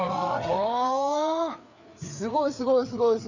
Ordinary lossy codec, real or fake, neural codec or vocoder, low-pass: none; fake; codec, 16 kHz, 4 kbps, FreqCodec, larger model; 7.2 kHz